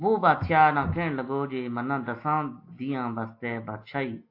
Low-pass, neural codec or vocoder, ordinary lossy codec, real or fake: 5.4 kHz; none; AAC, 32 kbps; real